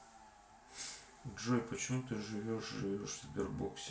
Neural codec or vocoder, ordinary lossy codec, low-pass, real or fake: none; none; none; real